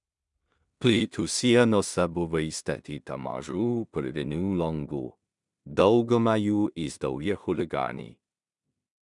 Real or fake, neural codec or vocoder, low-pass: fake; codec, 16 kHz in and 24 kHz out, 0.4 kbps, LongCat-Audio-Codec, two codebook decoder; 10.8 kHz